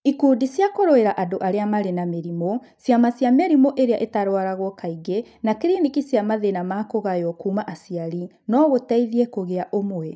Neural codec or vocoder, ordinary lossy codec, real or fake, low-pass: none; none; real; none